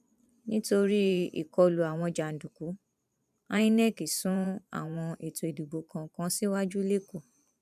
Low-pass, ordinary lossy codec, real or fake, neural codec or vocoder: 14.4 kHz; none; fake; vocoder, 44.1 kHz, 128 mel bands every 512 samples, BigVGAN v2